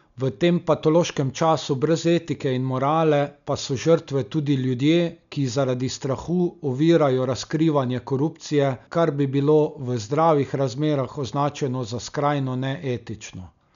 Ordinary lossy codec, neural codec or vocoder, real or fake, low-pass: none; none; real; 7.2 kHz